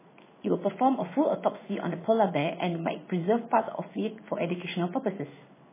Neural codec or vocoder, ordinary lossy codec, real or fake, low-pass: codec, 16 kHz in and 24 kHz out, 1 kbps, XY-Tokenizer; MP3, 16 kbps; fake; 3.6 kHz